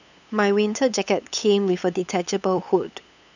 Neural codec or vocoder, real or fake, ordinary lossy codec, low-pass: codec, 16 kHz, 8 kbps, FunCodec, trained on LibriTTS, 25 frames a second; fake; none; 7.2 kHz